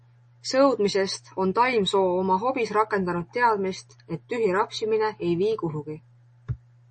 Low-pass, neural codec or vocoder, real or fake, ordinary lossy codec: 10.8 kHz; none; real; MP3, 32 kbps